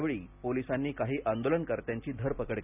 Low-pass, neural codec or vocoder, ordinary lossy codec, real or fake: 3.6 kHz; none; none; real